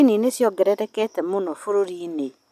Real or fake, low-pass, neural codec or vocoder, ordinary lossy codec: real; 14.4 kHz; none; none